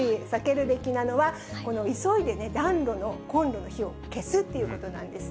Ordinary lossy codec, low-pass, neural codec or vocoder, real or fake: none; none; none; real